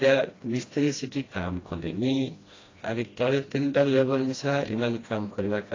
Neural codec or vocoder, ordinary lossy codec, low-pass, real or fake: codec, 16 kHz, 1 kbps, FreqCodec, smaller model; AAC, 32 kbps; 7.2 kHz; fake